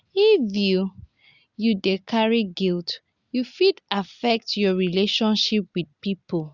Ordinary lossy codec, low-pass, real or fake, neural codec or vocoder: Opus, 64 kbps; 7.2 kHz; real; none